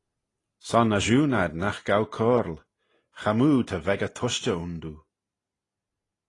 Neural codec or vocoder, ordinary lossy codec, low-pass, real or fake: none; AAC, 32 kbps; 10.8 kHz; real